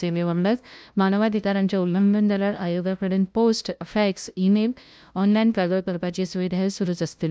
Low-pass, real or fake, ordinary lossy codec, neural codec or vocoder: none; fake; none; codec, 16 kHz, 0.5 kbps, FunCodec, trained on LibriTTS, 25 frames a second